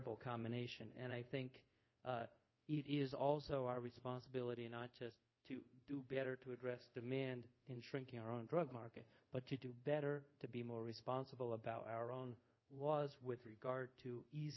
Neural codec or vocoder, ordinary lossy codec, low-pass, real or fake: codec, 24 kHz, 0.5 kbps, DualCodec; MP3, 24 kbps; 7.2 kHz; fake